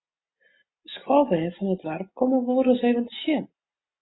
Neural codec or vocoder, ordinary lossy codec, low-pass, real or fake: none; AAC, 16 kbps; 7.2 kHz; real